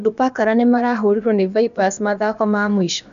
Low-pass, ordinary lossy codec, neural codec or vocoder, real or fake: 7.2 kHz; none; codec, 16 kHz, about 1 kbps, DyCAST, with the encoder's durations; fake